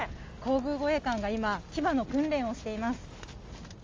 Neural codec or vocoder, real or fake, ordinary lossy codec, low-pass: none; real; Opus, 32 kbps; 7.2 kHz